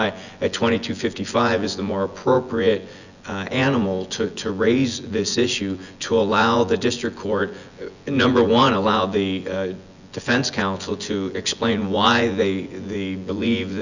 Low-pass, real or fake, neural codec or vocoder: 7.2 kHz; fake; vocoder, 24 kHz, 100 mel bands, Vocos